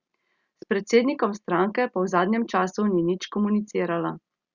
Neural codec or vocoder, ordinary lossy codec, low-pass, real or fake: none; Opus, 64 kbps; 7.2 kHz; real